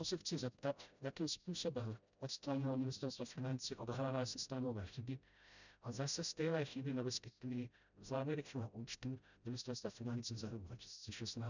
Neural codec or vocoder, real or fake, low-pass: codec, 16 kHz, 0.5 kbps, FreqCodec, smaller model; fake; 7.2 kHz